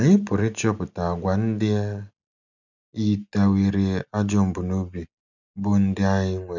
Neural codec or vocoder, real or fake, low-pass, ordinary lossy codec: none; real; 7.2 kHz; none